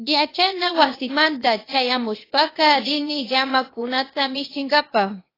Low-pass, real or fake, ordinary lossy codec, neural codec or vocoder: 5.4 kHz; fake; AAC, 24 kbps; codec, 16 kHz, 0.8 kbps, ZipCodec